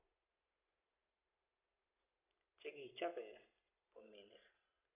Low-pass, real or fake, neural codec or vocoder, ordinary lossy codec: 3.6 kHz; real; none; none